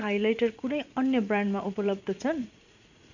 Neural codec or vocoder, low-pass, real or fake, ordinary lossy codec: vocoder, 44.1 kHz, 80 mel bands, Vocos; 7.2 kHz; fake; none